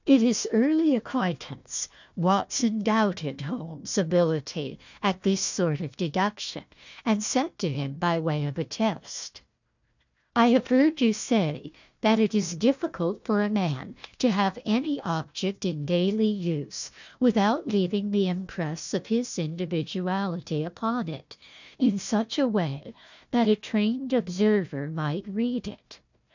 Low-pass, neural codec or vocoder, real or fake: 7.2 kHz; codec, 16 kHz, 1 kbps, FunCodec, trained on Chinese and English, 50 frames a second; fake